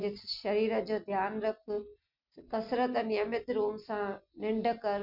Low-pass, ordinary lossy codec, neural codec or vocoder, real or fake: 5.4 kHz; MP3, 48 kbps; none; real